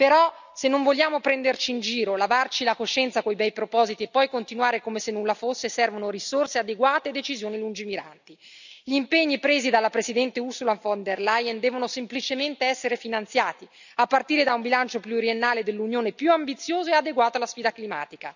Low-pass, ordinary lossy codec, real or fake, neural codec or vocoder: 7.2 kHz; none; real; none